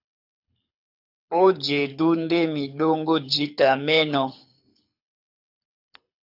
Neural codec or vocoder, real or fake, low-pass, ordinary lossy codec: codec, 24 kHz, 6 kbps, HILCodec; fake; 5.4 kHz; AAC, 48 kbps